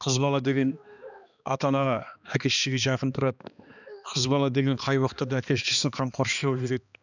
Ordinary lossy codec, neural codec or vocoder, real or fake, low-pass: none; codec, 16 kHz, 2 kbps, X-Codec, HuBERT features, trained on balanced general audio; fake; 7.2 kHz